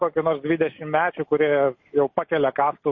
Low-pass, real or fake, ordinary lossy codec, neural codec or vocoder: 7.2 kHz; real; MP3, 32 kbps; none